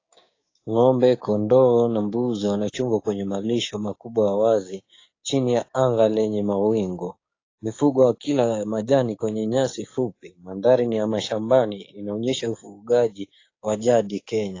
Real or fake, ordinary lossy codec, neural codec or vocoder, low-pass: fake; AAC, 32 kbps; codec, 16 kHz, 6 kbps, DAC; 7.2 kHz